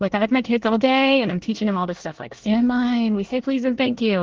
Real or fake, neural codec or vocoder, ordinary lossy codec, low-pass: fake; codec, 24 kHz, 1 kbps, SNAC; Opus, 16 kbps; 7.2 kHz